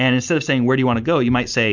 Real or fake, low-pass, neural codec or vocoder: real; 7.2 kHz; none